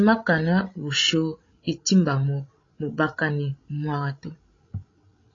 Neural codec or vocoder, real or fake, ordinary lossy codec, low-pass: codec, 16 kHz, 16 kbps, FreqCodec, larger model; fake; AAC, 32 kbps; 7.2 kHz